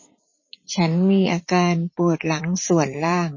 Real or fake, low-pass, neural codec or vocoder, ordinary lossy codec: fake; 7.2 kHz; vocoder, 44.1 kHz, 80 mel bands, Vocos; MP3, 32 kbps